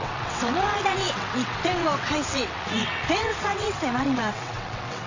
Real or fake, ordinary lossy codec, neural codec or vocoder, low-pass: fake; AAC, 48 kbps; vocoder, 22.05 kHz, 80 mel bands, WaveNeXt; 7.2 kHz